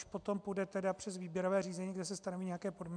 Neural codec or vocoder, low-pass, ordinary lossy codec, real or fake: none; 9.9 kHz; MP3, 96 kbps; real